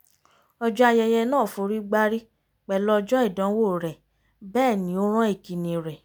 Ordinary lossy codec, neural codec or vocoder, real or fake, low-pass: none; none; real; none